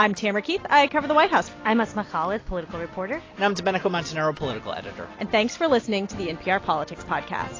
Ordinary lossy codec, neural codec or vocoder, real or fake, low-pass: AAC, 32 kbps; none; real; 7.2 kHz